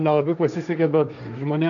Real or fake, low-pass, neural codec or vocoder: fake; 7.2 kHz; codec, 16 kHz, 1.1 kbps, Voila-Tokenizer